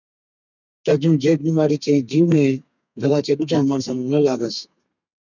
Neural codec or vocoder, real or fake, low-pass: codec, 32 kHz, 1.9 kbps, SNAC; fake; 7.2 kHz